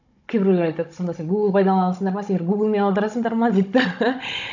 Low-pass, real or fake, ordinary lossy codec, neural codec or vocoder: 7.2 kHz; fake; none; codec, 16 kHz, 16 kbps, FunCodec, trained on Chinese and English, 50 frames a second